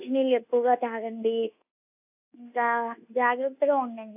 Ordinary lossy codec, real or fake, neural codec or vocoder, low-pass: AAC, 32 kbps; fake; codec, 24 kHz, 1.2 kbps, DualCodec; 3.6 kHz